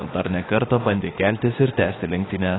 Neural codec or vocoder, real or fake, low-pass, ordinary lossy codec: autoencoder, 48 kHz, 32 numbers a frame, DAC-VAE, trained on Japanese speech; fake; 7.2 kHz; AAC, 16 kbps